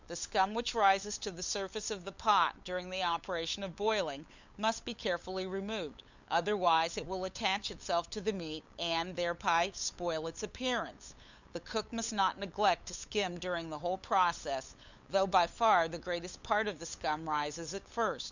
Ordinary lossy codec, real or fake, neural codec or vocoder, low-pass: Opus, 64 kbps; fake; codec, 16 kHz, 8 kbps, FunCodec, trained on LibriTTS, 25 frames a second; 7.2 kHz